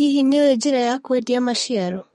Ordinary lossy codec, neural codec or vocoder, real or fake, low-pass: MP3, 48 kbps; codec, 32 kHz, 1.9 kbps, SNAC; fake; 14.4 kHz